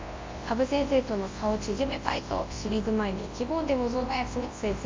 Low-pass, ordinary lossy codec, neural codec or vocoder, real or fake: 7.2 kHz; MP3, 32 kbps; codec, 24 kHz, 0.9 kbps, WavTokenizer, large speech release; fake